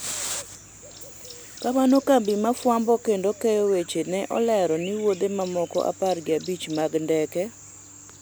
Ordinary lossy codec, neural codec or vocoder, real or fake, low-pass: none; none; real; none